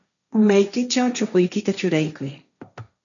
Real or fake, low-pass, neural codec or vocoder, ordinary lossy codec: fake; 7.2 kHz; codec, 16 kHz, 1.1 kbps, Voila-Tokenizer; MP3, 96 kbps